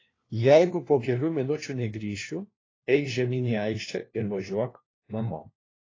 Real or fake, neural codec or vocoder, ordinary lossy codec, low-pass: fake; codec, 16 kHz, 1 kbps, FunCodec, trained on LibriTTS, 50 frames a second; AAC, 32 kbps; 7.2 kHz